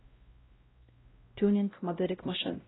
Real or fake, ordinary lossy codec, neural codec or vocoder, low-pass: fake; AAC, 16 kbps; codec, 16 kHz, 0.5 kbps, X-Codec, WavLM features, trained on Multilingual LibriSpeech; 7.2 kHz